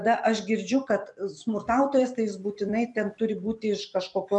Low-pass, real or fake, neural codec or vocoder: 10.8 kHz; fake; vocoder, 44.1 kHz, 128 mel bands every 256 samples, BigVGAN v2